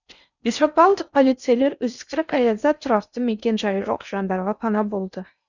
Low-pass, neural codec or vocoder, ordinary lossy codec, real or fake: 7.2 kHz; codec, 16 kHz in and 24 kHz out, 0.6 kbps, FocalCodec, streaming, 4096 codes; none; fake